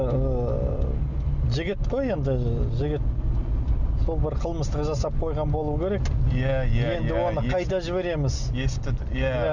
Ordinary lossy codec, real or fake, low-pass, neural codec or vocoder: none; real; 7.2 kHz; none